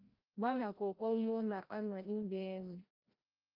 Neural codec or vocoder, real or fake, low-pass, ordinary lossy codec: codec, 16 kHz, 0.5 kbps, FreqCodec, larger model; fake; 5.4 kHz; Opus, 24 kbps